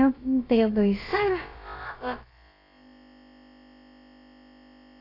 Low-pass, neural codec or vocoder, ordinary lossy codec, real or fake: 5.4 kHz; codec, 16 kHz, about 1 kbps, DyCAST, with the encoder's durations; AAC, 24 kbps; fake